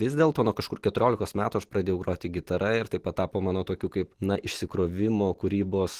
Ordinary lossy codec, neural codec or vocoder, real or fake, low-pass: Opus, 16 kbps; none; real; 14.4 kHz